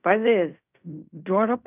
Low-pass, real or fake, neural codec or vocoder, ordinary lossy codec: 3.6 kHz; fake; codec, 16 kHz in and 24 kHz out, 0.4 kbps, LongCat-Audio-Codec, fine tuned four codebook decoder; none